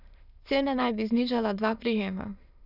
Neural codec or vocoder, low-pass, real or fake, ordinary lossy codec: autoencoder, 22.05 kHz, a latent of 192 numbers a frame, VITS, trained on many speakers; 5.4 kHz; fake; none